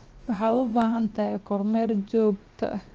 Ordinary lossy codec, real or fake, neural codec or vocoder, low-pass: Opus, 24 kbps; fake; codec, 16 kHz, 0.7 kbps, FocalCodec; 7.2 kHz